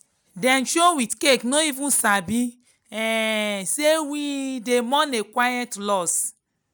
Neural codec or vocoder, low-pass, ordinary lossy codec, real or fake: none; none; none; real